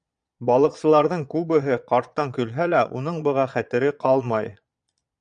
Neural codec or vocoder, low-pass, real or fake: vocoder, 22.05 kHz, 80 mel bands, Vocos; 9.9 kHz; fake